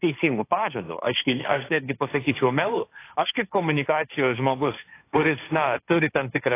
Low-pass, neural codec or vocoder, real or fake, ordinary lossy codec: 3.6 kHz; codec, 16 kHz, 1.1 kbps, Voila-Tokenizer; fake; AAC, 24 kbps